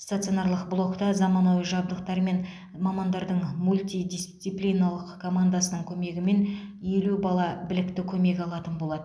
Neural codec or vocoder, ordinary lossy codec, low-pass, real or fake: none; none; none; real